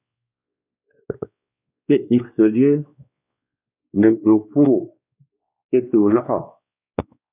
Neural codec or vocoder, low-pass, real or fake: codec, 16 kHz, 2 kbps, X-Codec, WavLM features, trained on Multilingual LibriSpeech; 3.6 kHz; fake